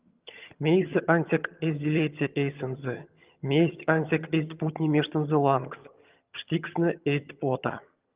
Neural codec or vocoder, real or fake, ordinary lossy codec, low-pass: vocoder, 22.05 kHz, 80 mel bands, HiFi-GAN; fake; Opus, 32 kbps; 3.6 kHz